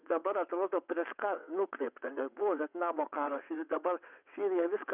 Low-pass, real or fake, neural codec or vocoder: 3.6 kHz; fake; vocoder, 22.05 kHz, 80 mel bands, WaveNeXt